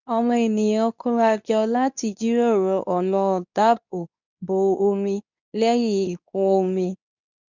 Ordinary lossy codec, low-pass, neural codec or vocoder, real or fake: none; 7.2 kHz; codec, 24 kHz, 0.9 kbps, WavTokenizer, medium speech release version 2; fake